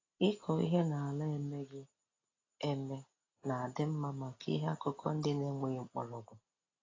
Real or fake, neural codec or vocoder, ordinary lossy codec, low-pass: real; none; AAC, 32 kbps; 7.2 kHz